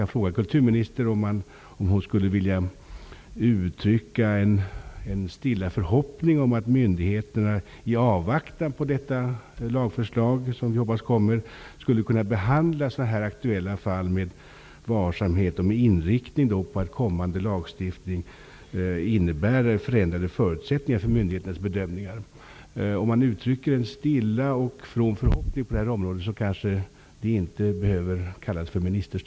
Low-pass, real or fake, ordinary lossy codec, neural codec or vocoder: none; real; none; none